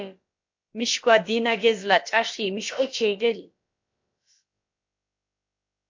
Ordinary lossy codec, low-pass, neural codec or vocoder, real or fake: MP3, 48 kbps; 7.2 kHz; codec, 16 kHz, about 1 kbps, DyCAST, with the encoder's durations; fake